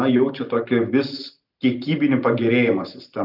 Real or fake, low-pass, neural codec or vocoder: real; 5.4 kHz; none